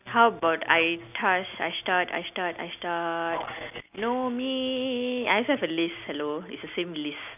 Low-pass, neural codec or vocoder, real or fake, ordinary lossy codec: 3.6 kHz; none; real; none